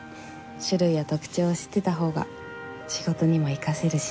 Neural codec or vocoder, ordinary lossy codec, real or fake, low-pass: none; none; real; none